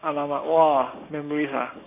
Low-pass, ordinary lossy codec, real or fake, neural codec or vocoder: 3.6 kHz; AAC, 24 kbps; fake; codec, 16 kHz, 6 kbps, DAC